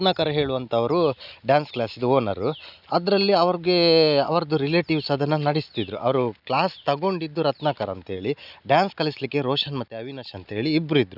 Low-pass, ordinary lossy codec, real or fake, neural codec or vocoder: 5.4 kHz; none; real; none